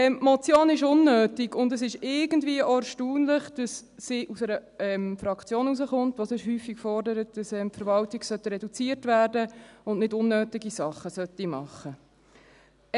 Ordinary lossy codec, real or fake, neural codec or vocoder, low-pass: none; real; none; 10.8 kHz